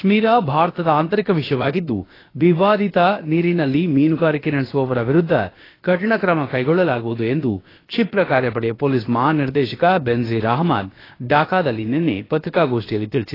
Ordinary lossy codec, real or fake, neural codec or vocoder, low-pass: AAC, 24 kbps; fake; codec, 16 kHz, about 1 kbps, DyCAST, with the encoder's durations; 5.4 kHz